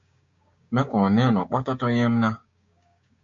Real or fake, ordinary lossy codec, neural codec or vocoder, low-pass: fake; MP3, 48 kbps; codec, 16 kHz, 6 kbps, DAC; 7.2 kHz